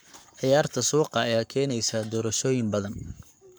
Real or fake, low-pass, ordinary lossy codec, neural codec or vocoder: fake; none; none; codec, 44.1 kHz, 7.8 kbps, Pupu-Codec